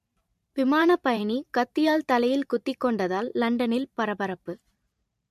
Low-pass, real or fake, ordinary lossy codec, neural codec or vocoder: 14.4 kHz; fake; MP3, 64 kbps; vocoder, 44.1 kHz, 128 mel bands every 512 samples, BigVGAN v2